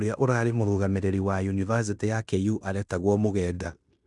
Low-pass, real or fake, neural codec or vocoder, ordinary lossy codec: 10.8 kHz; fake; codec, 16 kHz in and 24 kHz out, 0.9 kbps, LongCat-Audio-Codec, fine tuned four codebook decoder; none